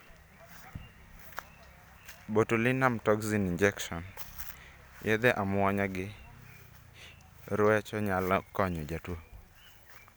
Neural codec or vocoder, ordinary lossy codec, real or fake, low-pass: none; none; real; none